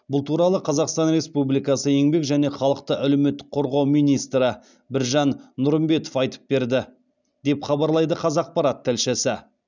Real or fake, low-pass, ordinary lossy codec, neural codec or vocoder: real; 7.2 kHz; none; none